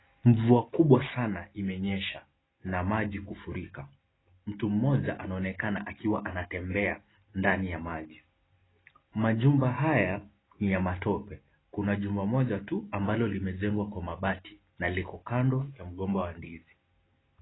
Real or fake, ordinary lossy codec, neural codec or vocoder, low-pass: real; AAC, 16 kbps; none; 7.2 kHz